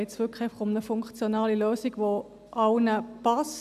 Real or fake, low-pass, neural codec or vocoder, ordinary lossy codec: real; 14.4 kHz; none; AAC, 96 kbps